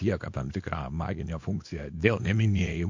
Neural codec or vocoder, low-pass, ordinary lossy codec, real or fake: codec, 24 kHz, 0.9 kbps, WavTokenizer, small release; 7.2 kHz; MP3, 48 kbps; fake